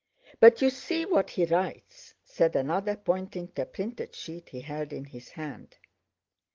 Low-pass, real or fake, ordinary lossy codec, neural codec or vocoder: 7.2 kHz; real; Opus, 32 kbps; none